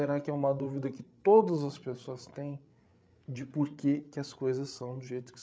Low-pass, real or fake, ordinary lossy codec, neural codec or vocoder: none; fake; none; codec, 16 kHz, 8 kbps, FreqCodec, larger model